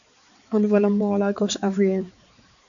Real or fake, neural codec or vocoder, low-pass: fake; codec, 16 kHz, 4 kbps, X-Codec, HuBERT features, trained on balanced general audio; 7.2 kHz